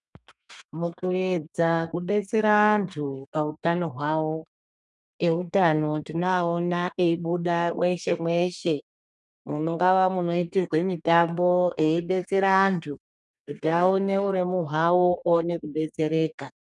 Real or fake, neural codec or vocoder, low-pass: fake; codec, 32 kHz, 1.9 kbps, SNAC; 10.8 kHz